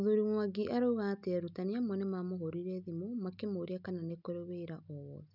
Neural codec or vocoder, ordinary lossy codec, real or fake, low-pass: none; none; real; 5.4 kHz